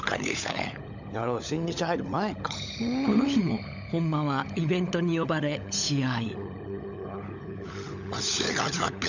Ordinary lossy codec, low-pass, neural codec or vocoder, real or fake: none; 7.2 kHz; codec, 16 kHz, 16 kbps, FunCodec, trained on LibriTTS, 50 frames a second; fake